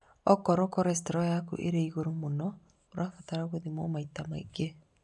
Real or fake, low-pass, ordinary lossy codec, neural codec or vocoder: real; 10.8 kHz; none; none